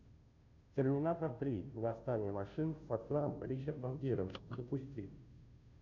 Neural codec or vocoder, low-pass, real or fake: codec, 16 kHz, 0.5 kbps, FunCodec, trained on Chinese and English, 25 frames a second; 7.2 kHz; fake